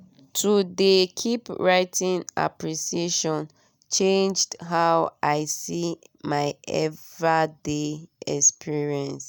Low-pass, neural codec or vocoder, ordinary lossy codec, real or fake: none; none; none; real